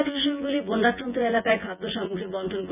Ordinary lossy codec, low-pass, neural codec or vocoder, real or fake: none; 3.6 kHz; vocoder, 24 kHz, 100 mel bands, Vocos; fake